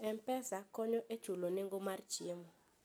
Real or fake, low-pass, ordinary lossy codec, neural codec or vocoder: real; none; none; none